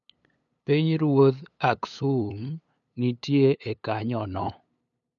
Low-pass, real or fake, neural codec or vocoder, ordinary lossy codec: 7.2 kHz; fake; codec, 16 kHz, 8 kbps, FunCodec, trained on LibriTTS, 25 frames a second; none